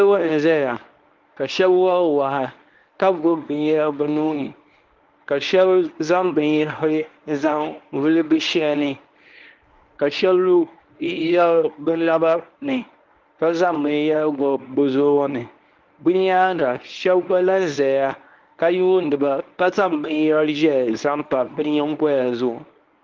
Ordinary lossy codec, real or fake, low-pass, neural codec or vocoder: Opus, 32 kbps; fake; 7.2 kHz; codec, 24 kHz, 0.9 kbps, WavTokenizer, medium speech release version 1